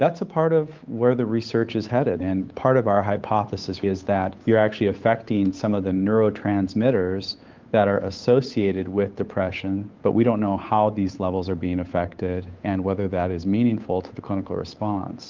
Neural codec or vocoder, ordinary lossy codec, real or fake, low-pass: codec, 16 kHz, 8 kbps, FunCodec, trained on Chinese and English, 25 frames a second; Opus, 24 kbps; fake; 7.2 kHz